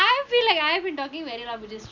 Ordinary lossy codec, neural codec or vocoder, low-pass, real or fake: MP3, 64 kbps; none; 7.2 kHz; real